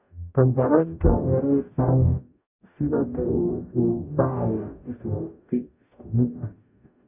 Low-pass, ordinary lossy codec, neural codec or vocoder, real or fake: 3.6 kHz; none; codec, 44.1 kHz, 0.9 kbps, DAC; fake